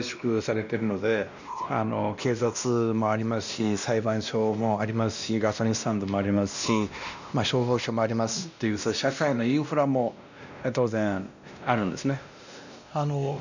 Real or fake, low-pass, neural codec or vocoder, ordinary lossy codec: fake; 7.2 kHz; codec, 16 kHz, 1 kbps, X-Codec, WavLM features, trained on Multilingual LibriSpeech; none